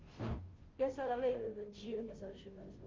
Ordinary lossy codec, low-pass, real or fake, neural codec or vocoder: Opus, 32 kbps; 7.2 kHz; fake; codec, 16 kHz, 0.5 kbps, FunCodec, trained on Chinese and English, 25 frames a second